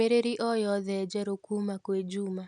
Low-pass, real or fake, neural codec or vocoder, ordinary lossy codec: 10.8 kHz; real; none; none